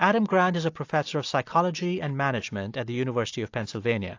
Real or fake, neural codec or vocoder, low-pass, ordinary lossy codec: real; none; 7.2 kHz; AAC, 48 kbps